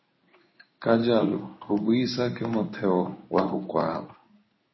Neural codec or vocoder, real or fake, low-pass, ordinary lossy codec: codec, 16 kHz in and 24 kHz out, 1 kbps, XY-Tokenizer; fake; 7.2 kHz; MP3, 24 kbps